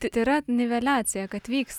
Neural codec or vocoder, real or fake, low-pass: none; real; 19.8 kHz